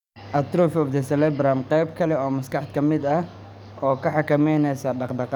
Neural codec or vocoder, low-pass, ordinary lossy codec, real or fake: codec, 44.1 kHz, 7.8 kbps, DAC; 19.8 kHz; none; fake